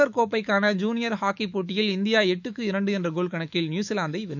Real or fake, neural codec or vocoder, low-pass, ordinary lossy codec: fake; autoencoder, 48 kHz, 128 numbers a frame, DAC-VAE, trained on Japanese speech; 7.2 kHz; none